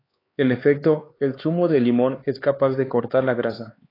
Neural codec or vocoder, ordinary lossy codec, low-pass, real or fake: codec, 16 kHz, 4 kbps, X-Codec, HuBERT features, trained on LibriSpeech; AAC, 24 kbps; 5.4 kHz; fake